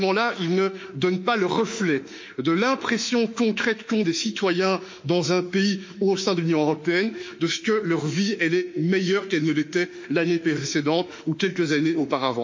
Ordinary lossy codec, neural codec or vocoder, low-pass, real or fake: MP3, 48 kbps; autoencoder, 48 kHz, 32 numbers a frame, DAC-VAE, trained on Japanese speech; 7.2 kHz; fake